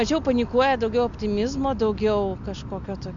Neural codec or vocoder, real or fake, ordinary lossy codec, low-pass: none; real; MP3, 64 kbps; 7.2 kHz